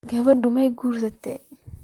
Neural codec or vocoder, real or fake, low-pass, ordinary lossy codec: vocoder, 44.1 kHz, 128 mel bands, Pupu-Vocoder; fake; 19.8 kHz; Opus, 32 kbps